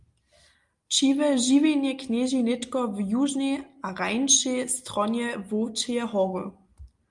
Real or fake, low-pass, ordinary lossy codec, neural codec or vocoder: real; 10.8 kHz; Opus, 32 kbps; none